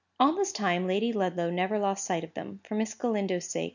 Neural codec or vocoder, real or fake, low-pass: none; real; 7.2 kHz